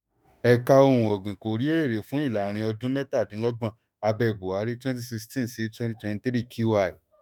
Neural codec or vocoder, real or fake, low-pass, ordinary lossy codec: autoencoder, 48 kHz, 32 numbers a frame, DAC-VAE, trained on Japanese speech; fake; none; none